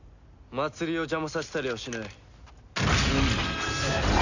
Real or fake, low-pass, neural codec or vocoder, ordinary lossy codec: real; 7.2 kHz; none; none